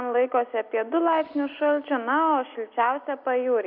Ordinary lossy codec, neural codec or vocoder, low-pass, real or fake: AAC, 48 kbps; none; 5.4 kHz; real